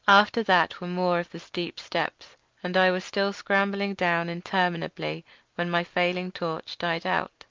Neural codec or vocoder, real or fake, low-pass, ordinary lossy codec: none; real; 7.2 kHz; Opus, 24 kbps